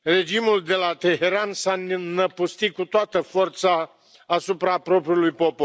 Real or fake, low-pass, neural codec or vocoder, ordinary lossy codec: real; none; none; none